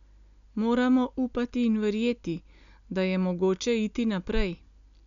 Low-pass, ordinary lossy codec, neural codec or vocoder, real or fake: 7.2 kHz; none; none; real